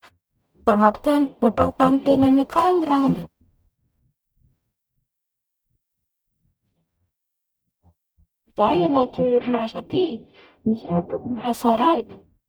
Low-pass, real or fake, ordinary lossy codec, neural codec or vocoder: none; fake; none; codec, 44.1 kHz, 0.9 kbps, DAC